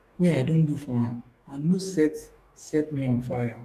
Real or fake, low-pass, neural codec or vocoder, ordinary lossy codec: fake; 14.4 kHz; codec, 44.1 kHz, 2.6 kbps, DAC; none